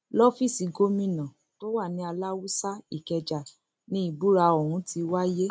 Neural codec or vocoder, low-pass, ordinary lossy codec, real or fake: none; none; none; real